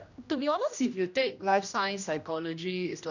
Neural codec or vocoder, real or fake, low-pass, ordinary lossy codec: codec, 16 kHz, 1 kbps, X-Codec, HuBERT features, trained on general audio; fake; 7.2 kHz; none